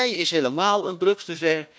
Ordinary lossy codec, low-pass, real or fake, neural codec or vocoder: none; none; fake; codec, 16 kHz, 1 kbps, FunCodec, trained on Chinese and English, 50 frames a second